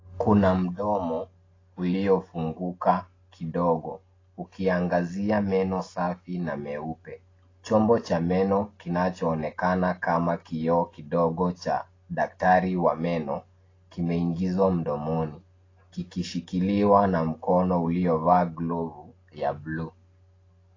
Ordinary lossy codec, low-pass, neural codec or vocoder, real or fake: AAC, 32 kbps; 7.2 kHz; none; real